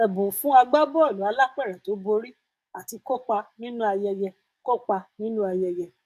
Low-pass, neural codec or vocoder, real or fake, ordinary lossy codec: 14.4 kHz; codec, 44.1 kHz, 7.8 kbps, DAC; fake; AAC, 96 kbps